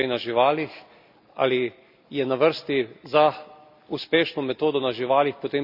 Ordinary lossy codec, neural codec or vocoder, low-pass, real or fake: none; none; 5.4 kHz; real